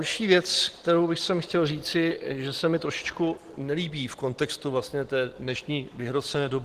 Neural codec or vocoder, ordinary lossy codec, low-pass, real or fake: none; Opus, 16 kbps; 14.4 kHz; real